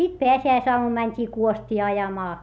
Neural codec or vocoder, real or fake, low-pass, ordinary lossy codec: none; real; none; none